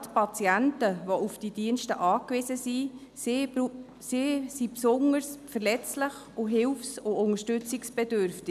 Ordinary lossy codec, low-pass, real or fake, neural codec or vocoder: none; 14.4 kHz; real; none